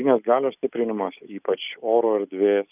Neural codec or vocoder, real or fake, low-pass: none; real; 3.6 kHz